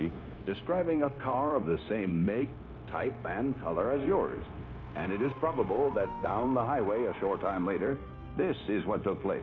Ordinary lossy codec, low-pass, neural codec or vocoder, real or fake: AAC, 48 kbps; 7.2 kHz; codec, 16 kHz, 0.9 kbps, LongCat-Audio-Codec; fake